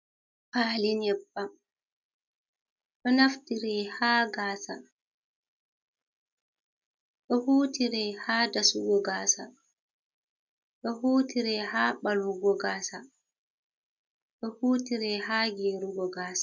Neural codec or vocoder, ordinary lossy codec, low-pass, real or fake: none; MP3, 64 kbps; 7.2 kHz; real